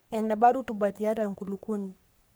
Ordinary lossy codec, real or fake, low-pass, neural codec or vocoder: none; fake; none; codec, 44.1 kHz, 3.4 kbps, Pupu-Codec